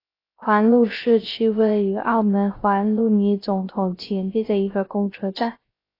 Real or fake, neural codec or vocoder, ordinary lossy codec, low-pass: fake; codec, 16 kHz, 0.3 kbps, FocalCodec; AAC, 24 kbps; 5.4 kHz